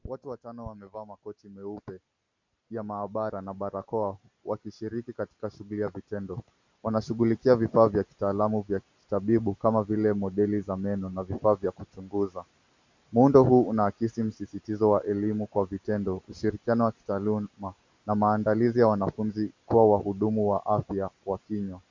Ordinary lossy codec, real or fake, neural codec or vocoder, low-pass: MP3, 64 kbps; real; none; 7.2 kHz